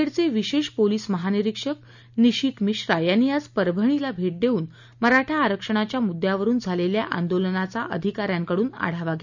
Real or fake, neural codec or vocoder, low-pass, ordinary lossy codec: real; none; 7.2 kHz; none